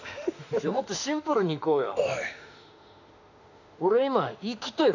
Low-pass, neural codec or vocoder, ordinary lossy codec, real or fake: 7.2 kHz; autoencoder, 48 kHz, 32 numbers a frame, DAC-VAE, trained on Japanese speech; none; fake